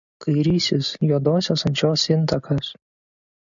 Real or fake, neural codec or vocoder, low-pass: real; none; 7.2 kHz